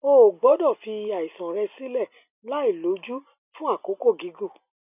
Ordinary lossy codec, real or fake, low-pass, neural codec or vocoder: none; real; 3.6 kHz; none